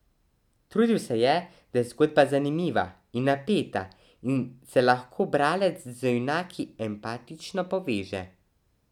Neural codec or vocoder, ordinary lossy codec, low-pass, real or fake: none; none; 19.8 kHz; real